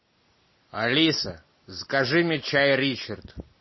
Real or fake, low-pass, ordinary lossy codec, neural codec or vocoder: real; 7.2 kHz; MP3, 24 kbps; none